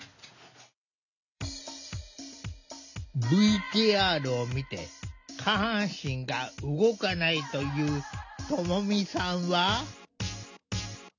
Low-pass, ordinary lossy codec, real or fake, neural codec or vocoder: 7.2 kHz; none; real; none